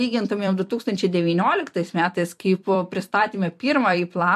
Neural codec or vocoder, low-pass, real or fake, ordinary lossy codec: vocoder, 44.1 kHz, 128 mel bands every 256 samples, BigVGAN v2; 14.4 kHz; fake; MP3, 64 kbps